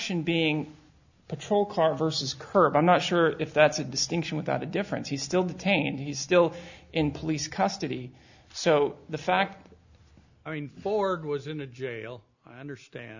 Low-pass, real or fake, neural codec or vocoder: 7.2 kHz; real; none